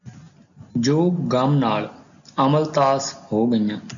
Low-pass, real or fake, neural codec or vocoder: 7.2 kHz; real; none